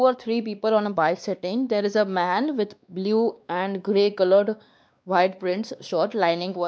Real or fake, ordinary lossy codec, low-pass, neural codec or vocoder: fake; none; none; codec, 16 kHz, 2 kbps, X-Codec, WavLM features, trained on Multilingual LibriSpeech